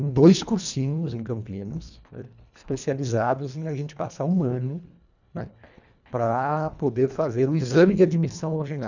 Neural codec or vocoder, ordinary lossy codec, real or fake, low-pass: codec, 24 kHz, 1.5 kbps, HILCodec; none; fake; 7.2 kHz